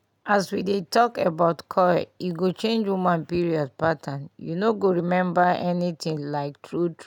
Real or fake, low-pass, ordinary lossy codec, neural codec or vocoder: real; 19.8 kHz; none; none